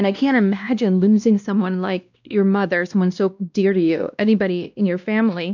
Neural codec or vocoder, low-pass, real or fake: codec, 16 kHz, 1 kbps, X-Codec, WavLM features, trained on Multilingual LibriSpeech; 7.2 kHz; fake